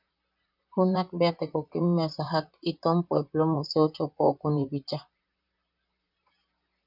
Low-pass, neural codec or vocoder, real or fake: 5.4 kHz; vocoder, 44.1 kHz, 128 mel bands, Pupu-Vocoder; fake